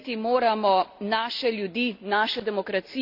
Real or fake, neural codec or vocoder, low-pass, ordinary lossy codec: real; none; 5.4 kHz; none